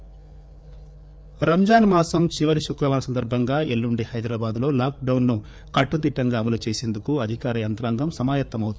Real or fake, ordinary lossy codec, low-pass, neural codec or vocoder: fake; none; none; codec, 16 kHz, 4 kbps, FreqCodec, larger model